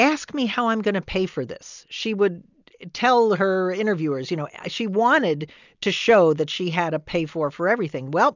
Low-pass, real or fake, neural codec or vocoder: 7.2 kHz; real; none